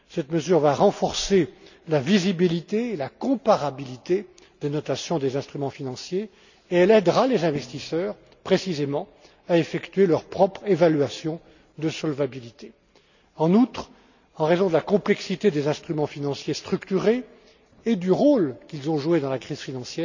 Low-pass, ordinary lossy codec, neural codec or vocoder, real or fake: 7.2 kHz; none; none; real